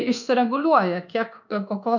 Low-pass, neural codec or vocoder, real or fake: 7.2 kHz; codec, 24 kHz, 1.2 kbps, DualCodec; fake